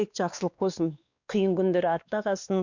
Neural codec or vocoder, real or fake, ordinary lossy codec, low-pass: codec, 16 kHz, 2 kbps, X-Codec, WavLM features, trained on Multilingual LibriSpeech; fake; none; 7.2 kHz